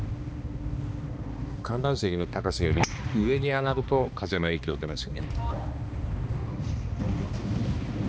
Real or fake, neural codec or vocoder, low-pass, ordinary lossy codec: fake; codec, 16 kHz, 2 kbps, X-Codec, HuBERT features, trained on balanced general audio; none; none